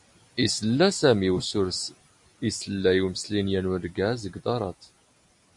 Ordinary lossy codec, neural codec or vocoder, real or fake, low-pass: MP3, 64 kbps; none; real; 10.8 kHz